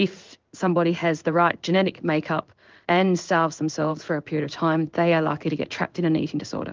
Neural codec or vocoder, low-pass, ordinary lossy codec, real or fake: codec, 16 kHz in and 24 kHz out, 1 kbps, XY-Tokenizer; 7.2 kHz; Opus, 24 kbps; fake